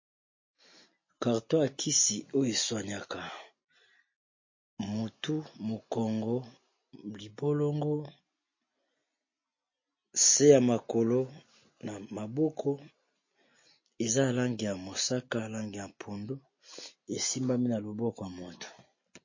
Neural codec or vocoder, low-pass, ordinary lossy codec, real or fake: none; 7.2 kHz; MP3, 32 kbps; real